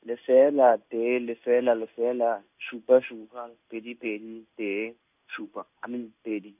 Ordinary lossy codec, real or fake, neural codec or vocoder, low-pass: none; fake; codec, 16 kHz in and 24 kHz out, 1 kbps, XY-Tokenizer; 3.6 kHz